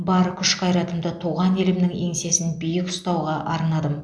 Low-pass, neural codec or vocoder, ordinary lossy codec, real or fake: none; none; none; real